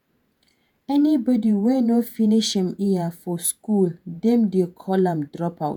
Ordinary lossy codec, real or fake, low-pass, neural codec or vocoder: none; fake; 19.8 kHz; vocoder, 48 kHz, 128 mel bands, Vocos